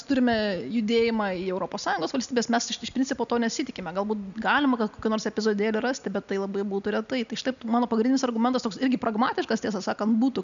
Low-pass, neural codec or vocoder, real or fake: 7.2 kHz; none; real